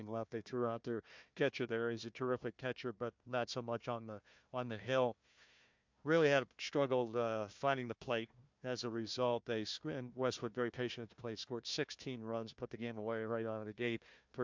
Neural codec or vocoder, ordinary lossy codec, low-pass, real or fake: codec, 16 kHz, 1 kbps, FunCodec, trained on Chinese and English, 50 frames a second; MP3, 64 kbps; 7.2 kHz; fake